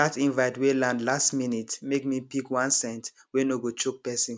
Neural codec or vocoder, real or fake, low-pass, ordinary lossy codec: none; real; none; none